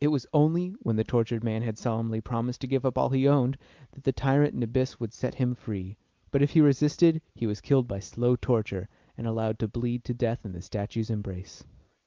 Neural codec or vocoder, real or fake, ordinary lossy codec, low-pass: none; real; Opus, 24 kbps; 7.2 kHz